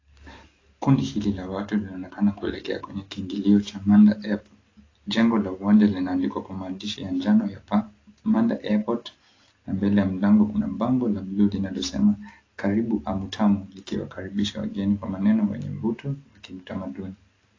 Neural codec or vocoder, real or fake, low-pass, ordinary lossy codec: none; real; 7.2 kHz; AAC, 32 kbps